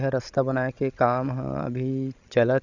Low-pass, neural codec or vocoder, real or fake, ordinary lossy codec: 7.2 kHz; codec, 16 kHz, 16 kbps, FreqCodec, larger model; fake; none